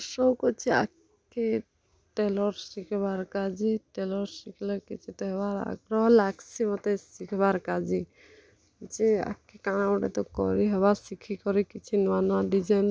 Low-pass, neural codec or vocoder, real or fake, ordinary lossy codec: none; none; real; none